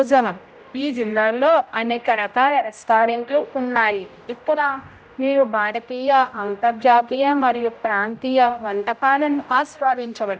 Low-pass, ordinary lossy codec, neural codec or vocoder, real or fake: none; none; codec, 16 kHz, 0.5 kbps, X-Codec, HuBERT features, trained on general audio; fake